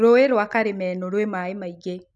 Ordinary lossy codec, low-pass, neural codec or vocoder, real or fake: none; none; none; real